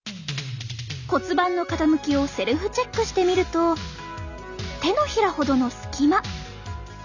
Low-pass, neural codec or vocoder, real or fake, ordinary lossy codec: 7.2 kHz; none; real; none